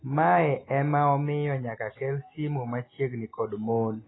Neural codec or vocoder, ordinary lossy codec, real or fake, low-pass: none; AAC, 16 kbps; real; 7.2 kHz